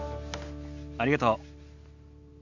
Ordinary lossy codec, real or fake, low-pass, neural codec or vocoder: none; real; 7.2 kHz; none